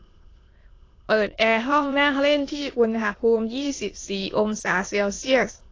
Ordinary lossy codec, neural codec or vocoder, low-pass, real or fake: AAC, 32 kbps; autoencoder, 22.05 kHz, a latent of 192 numbers a frame, VITS, trained on many speakers; 7.2 kHz; fake